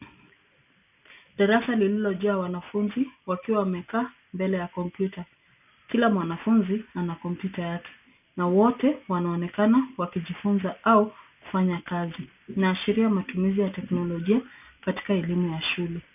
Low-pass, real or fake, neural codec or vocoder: 3.6 kHz; real; none